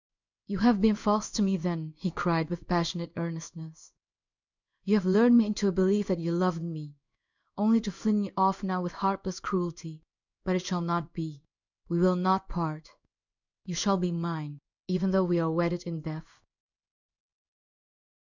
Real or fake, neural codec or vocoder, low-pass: fake; codec, 16 kHz in and 24 kHz out, 1 kbps, XY-Tokenizer; 7.2 kHz